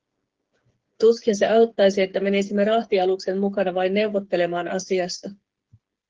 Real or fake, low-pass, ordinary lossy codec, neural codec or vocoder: fake; 7.2 kHz; Opus, 16 kbps; codec, 16 kHz, 8 kbps, FreqCodec, smaller model